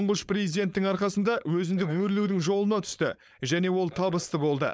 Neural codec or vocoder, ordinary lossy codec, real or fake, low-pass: codec, 16 kHz, 4.8 kbps, FACodec; none; fake; none